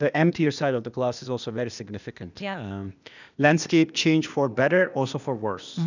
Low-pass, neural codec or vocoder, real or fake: 7.2 kHz; codec, 16 kHz, 0.8 kbps, ZipCodec; fake